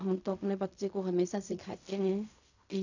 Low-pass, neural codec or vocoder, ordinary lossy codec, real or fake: 7.2 kHz; codec, 16 kHz in and 24 kHz out, 0.4 kbps, LongCat-Audio-Codec, fine tuned four codebook decoder; none; fake